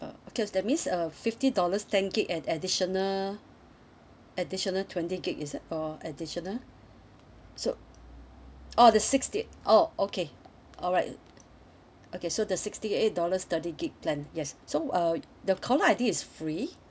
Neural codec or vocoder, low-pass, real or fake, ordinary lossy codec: none; none; real; none